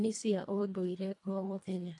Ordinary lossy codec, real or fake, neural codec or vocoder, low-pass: AAC, 48 kbps; fake; codec, 24 kHz, 1.5 kbps, HILCodec; 10.8 kHz